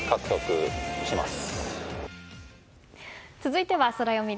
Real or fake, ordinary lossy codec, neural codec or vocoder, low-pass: real; none; none; none